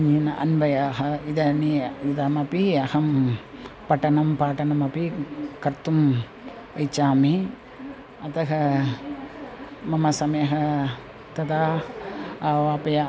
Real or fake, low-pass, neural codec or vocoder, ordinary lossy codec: real; none; none; none